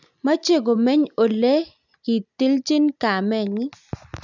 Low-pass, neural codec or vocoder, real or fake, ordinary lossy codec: 7.2 kHz; none; real; none